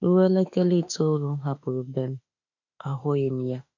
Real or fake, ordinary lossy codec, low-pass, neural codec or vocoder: fake; none; 7.2 kHz; autoencoder, 48 kHz, 32 numbers a frame, DAC-VAE, trained on Japanese speech